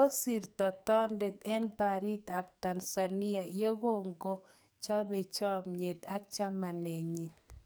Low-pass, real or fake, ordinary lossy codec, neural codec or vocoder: none; fake; none; codec, 44.1 kHz, 2.6 kbps, SNAC